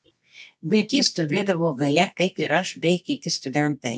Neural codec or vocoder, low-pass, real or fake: codec, 24 kHz, 0.9 kbps, WavTokenizer, medium music audio release; 10.8 kHz; fake